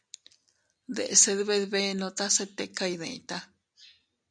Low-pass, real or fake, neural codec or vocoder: 9.9 kHz; real; none